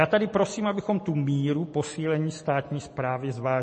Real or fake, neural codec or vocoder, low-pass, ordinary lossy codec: real; none; 10.8 kHz; MP3, 32 kbps